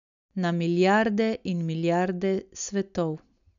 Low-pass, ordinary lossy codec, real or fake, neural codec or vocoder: 7.2 kHz; none; real; none